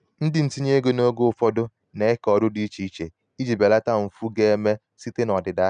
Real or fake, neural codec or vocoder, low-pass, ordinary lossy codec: real; none; 9.9 kHz; none